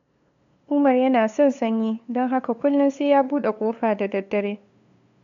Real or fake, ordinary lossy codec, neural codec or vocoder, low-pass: fake; MP3, 64 kbps; codec, 16 kHz, 2 kbps, FunCodec, trained on LibriTTS, 25 frames a second; 7.2 kHz